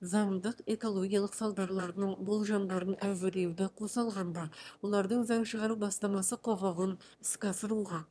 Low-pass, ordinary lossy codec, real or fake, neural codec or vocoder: none; none; fake; autoencoder, 22.05 kHz, a latent of 192 numbers a frame, VITS, trained on one speaker